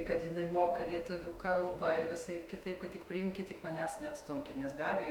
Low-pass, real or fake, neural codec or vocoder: 19.8 kHz; fake; autoencoder, 48 kHz, 32 numbers a frame, DAC-VAE, trained on Japanese speech